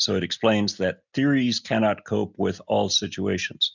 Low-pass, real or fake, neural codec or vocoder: 7.2 kHz; real; none